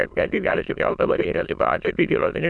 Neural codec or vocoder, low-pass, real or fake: autoencoder, 22.05 kHz, a latent of 192 numbers a frame, VITS, trained on many speakers; 9.9 kHz; fake